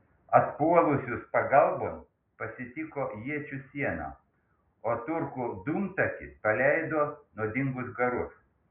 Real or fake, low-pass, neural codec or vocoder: real; 3.6 kHz; none